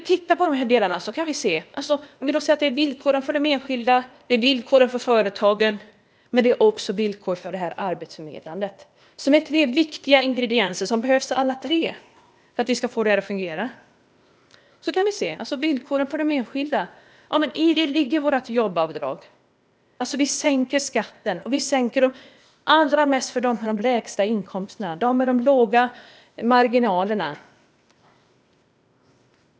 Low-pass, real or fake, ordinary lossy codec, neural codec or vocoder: none; fake; none; codec, 16 kHz, 0.8 kbps, ZipCodec